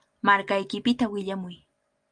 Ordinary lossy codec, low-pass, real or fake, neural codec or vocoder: Opus, 32 kbps; 9.9 kHz; real; none